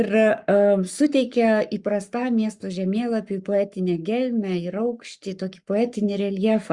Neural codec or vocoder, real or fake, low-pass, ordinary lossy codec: codec, 44.1 kHz, 7.8 kbps, Pupu-Codec; fake; 10.8 kHz; Opus, 64 kbps